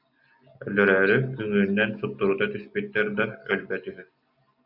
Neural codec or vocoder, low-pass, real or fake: none; 5.4 kHz; real